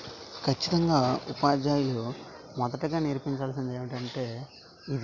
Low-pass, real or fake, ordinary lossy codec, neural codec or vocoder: 7.2 kHz; real; none; none